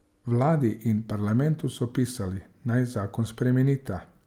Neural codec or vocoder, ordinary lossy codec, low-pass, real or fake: none; Opus, 32 kbps; 19.8 kHz; real